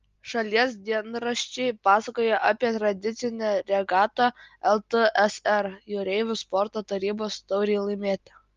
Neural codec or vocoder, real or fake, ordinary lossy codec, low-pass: none; real; Opus, 32 kbps; 7.2 kHz